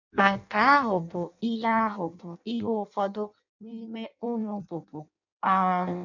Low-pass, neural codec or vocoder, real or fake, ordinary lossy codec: 7.2 kHz; codec, 16 kHz in and 24 kHz out, 0.6 kbps, FireRedTTS-2 codec; fake; none